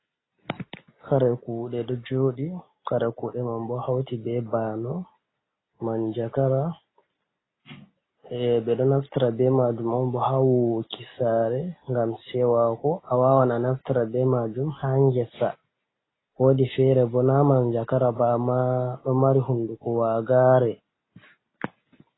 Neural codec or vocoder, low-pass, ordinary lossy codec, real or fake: none; 7.2 kHz; AAC, 16 kbps; real